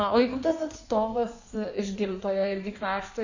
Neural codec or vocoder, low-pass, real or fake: codec, 16 kHz in and 24 kHz out, 1.1 kbps, FireRedTTS-2 codec; 7.2 kHz; fake